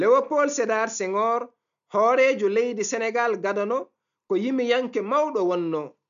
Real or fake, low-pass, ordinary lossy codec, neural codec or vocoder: real; 7.2 kHz; none; none